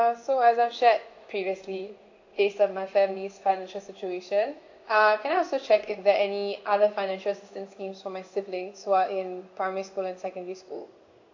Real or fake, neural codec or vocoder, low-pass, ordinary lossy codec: fake; codec, 16 kHz in and 24 kHz out, 1 kbps, XY-Tokenizer; 7.2 kHz; AAC, 48 kbps